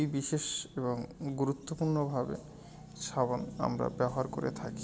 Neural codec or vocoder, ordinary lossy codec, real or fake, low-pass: none; none; real; none